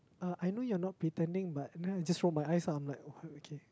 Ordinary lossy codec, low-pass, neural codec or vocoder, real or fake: none; none; none; real